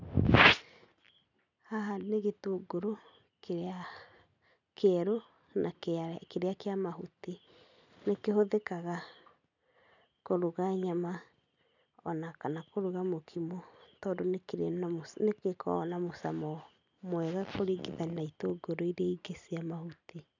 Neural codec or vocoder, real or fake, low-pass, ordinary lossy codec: none; real; 7.2 kHz; none